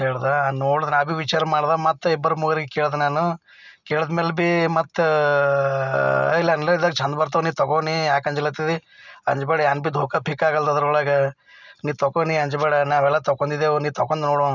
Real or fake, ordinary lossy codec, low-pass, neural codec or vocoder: real; none; 7.2 kHz; none